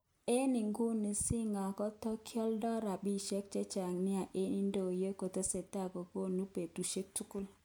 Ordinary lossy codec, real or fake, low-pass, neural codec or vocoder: none; real; none; none